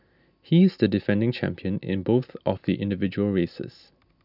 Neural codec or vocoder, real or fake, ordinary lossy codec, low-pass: none; real; none; 5.4 kHz